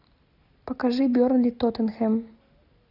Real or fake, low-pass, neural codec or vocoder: real; 5.4 kHz; none